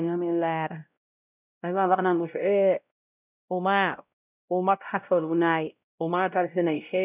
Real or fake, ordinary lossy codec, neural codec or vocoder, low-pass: fake; none; codec, 16 kHz, 0.5 kbps, X-Codec, WavLM features, trained on Multilingual LibriSpeech; 3.6 kHz